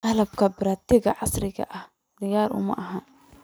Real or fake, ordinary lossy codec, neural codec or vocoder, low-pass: real; none; none; none